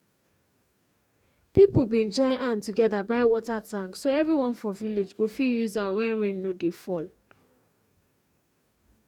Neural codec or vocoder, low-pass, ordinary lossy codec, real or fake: codec, 44.1 kHz, 2.6 kbps, DAC; 19.8 kHz; none; fake